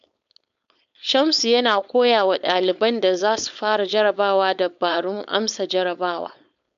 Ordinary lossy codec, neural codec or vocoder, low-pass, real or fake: none; codec, 16 kHz, 4.8 kbps, FACodec; 7.2 kHz; fake